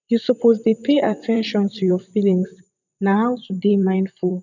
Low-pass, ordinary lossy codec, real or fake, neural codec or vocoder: 7.2 kHz; none; fake; vocoder, 44.1 kHz, 128 mel bands, Pupu-Vocoder